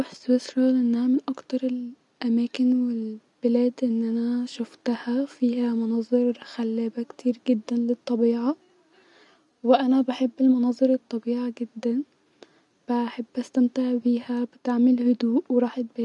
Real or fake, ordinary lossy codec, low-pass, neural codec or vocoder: real; none; 10.8 kHz; none